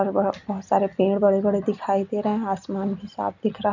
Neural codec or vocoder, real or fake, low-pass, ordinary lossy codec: none; real; 7.2 kHz; none